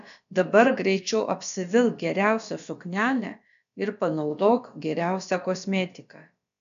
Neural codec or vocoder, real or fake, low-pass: codec, 16 kHz, about 1 kbps, DyCAST, with the encoder's durations; fake; 7.2 kHz